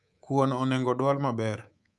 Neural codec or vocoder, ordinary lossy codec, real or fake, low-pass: codec, 24 kHz, 3.1 kbps, DualCodec; none; fake; none